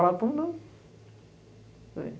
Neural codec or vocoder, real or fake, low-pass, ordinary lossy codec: none; real; none; none